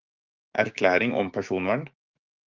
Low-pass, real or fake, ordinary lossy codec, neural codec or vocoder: 7.2 kHz; fake; Opus, 24 kbps; codec, 16 kHz, 6 kbps, DAC